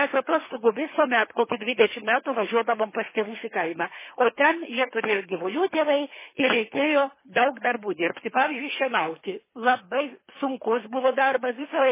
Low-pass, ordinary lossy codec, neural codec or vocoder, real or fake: 3.6 kHz; MP3, 16 kbps; codec, 24 kHz, 3 kbps, HILCodec; fake